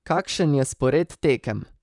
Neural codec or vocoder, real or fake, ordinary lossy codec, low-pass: vocoder, 44.1 kHz, 128 mel bands, Pupu-Vocoder; fake; none; 10.8 kHz